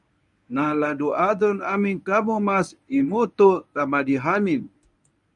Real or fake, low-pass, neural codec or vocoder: fake; 10.8 kHz; codec, 24 kHz, 0.9 kbps, WavTokenizer, medium speech release version 1